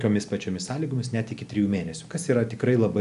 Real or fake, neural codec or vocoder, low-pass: real; none; 10.8 kHz